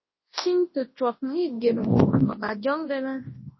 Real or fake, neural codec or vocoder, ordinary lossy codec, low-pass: fake; codec, 24 kHz, 0.9 kbps, WavTokenizer, large speech release; MP3, 24 kbps; 7.2 kHz